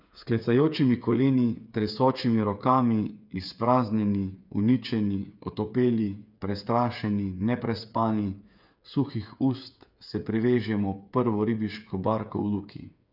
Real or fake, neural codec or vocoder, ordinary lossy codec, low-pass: fake; codec, 16 kHz, 8 kbps, FreqCodec, smaller model; none; 5.4 kHz